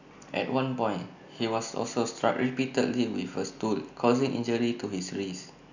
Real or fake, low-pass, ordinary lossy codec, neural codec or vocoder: real; 7.2 kHz; none; none